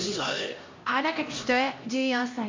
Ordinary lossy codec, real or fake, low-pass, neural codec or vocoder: MP3, 48 kbps; fake; 7.2 kHz; codec, 16 kHz, 1 kbps, X-Codec, HuBERT features, trained on LibriSpeech